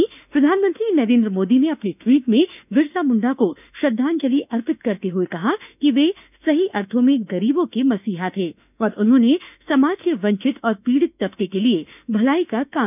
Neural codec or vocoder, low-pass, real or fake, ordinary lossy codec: autoencoder, 48 kHz, 32 numbers a frame, DAC-VAE, trained on Japanese speech; 3.6 kHz; fake; none